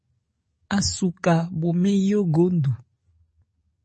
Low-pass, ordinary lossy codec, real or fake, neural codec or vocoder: 10.8 kHz; MP3, 32 kbps; fake; codec, 44.1 kHz, 7.8 kbps, DAC